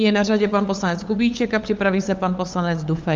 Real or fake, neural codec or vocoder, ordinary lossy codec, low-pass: fake; codec, 16 kHz, 8 kbps, FunCodec, trained on LibriTTS, 25 frames a second; Opus, 32 kbps; 7.2 kHz